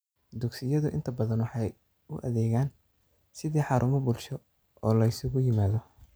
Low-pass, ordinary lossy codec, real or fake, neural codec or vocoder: none; none; real; none